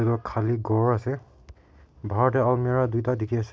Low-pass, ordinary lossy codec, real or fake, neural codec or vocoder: none; none; real; none